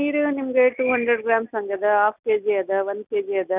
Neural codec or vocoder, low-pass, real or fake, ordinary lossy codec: none; 3.6 kHz; real; none